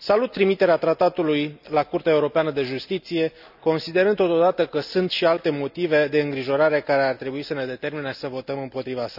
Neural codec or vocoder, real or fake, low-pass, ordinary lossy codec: none; real; 5.4 kHz; none